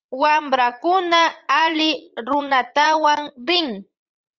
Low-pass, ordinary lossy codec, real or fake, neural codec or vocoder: 7.2 kHz; Opus, 32 kbps; fake; vocoder, 44.1 kHz, 80 mel bands, Vocos